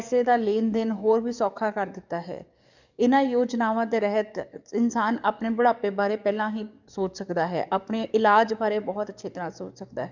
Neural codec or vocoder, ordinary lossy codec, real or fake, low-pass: codec, 44.1 kHz, 7.8 kbps, DAC; none; fake; 7.2 kHz